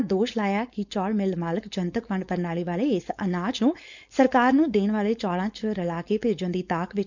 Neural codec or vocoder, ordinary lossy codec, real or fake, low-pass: codec, 16 kHz, 4.8 kbps, FACodec; none; fake; 7.2 kHz